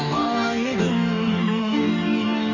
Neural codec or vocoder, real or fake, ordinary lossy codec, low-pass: codec, 44.1 kHz, 2.6 kbps, DAC; fake; none; 7.2 kHz